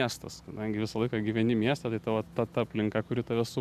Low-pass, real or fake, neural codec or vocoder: 14.4 kHz; real; none